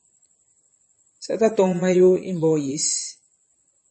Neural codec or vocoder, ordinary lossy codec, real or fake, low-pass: vocoder, 22.05 kHz, 80 mel bands, Vocos; MP3, 32 kbps; fake; 9.9 kHz